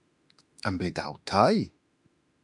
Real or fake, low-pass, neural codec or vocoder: fake; 10.8 kHz; autoencoder, 48 kHz, 32 numbers a frame, DAC-VAE, trained on Japanese speech